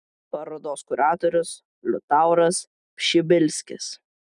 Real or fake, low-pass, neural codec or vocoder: real; 10.8 kHz; none